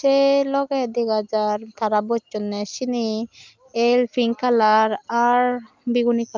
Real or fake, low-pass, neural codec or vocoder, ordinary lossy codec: real; 7.2 kHz; none; Opus, 24 kbps